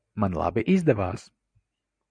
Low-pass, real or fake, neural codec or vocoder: 9.9 kHz; real; none